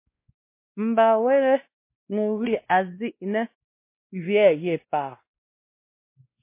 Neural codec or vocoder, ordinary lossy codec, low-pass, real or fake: codec, 16 kHz, 1 kbps, X-Codec, WavLM features, trained on Multilingual LibriSpeech; MP3, 24 kbps; 3.6 kHz; fake